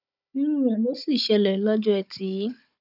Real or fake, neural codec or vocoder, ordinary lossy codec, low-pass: fake; codec, 16 kHz, 16 kbps, FunCodec, trained on Chinese and English, 50 frames a second; none; 5.4 kHz